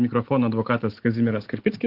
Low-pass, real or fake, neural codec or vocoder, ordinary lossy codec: 5.4 kHz; real; none; Opus, 16 kbps